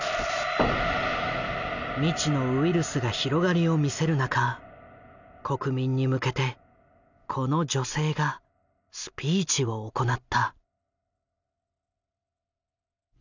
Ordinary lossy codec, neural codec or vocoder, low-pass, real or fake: none; none; 7.2 kHz; real